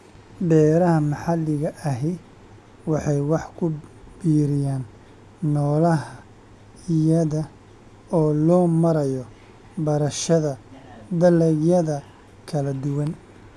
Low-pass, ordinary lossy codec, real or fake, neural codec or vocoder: none; none; real; none